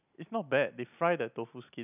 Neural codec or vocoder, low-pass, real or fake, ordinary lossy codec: none; 3.6 kHz; real; none